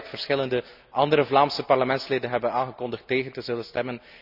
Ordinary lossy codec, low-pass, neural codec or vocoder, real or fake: none; 5.4 kHz; none; real